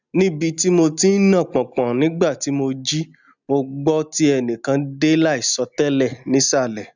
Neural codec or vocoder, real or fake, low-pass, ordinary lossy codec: none; real; 7.2 kHz; none